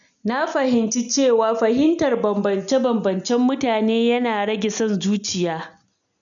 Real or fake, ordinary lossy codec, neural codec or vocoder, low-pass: real; none; none; 7.2 kHz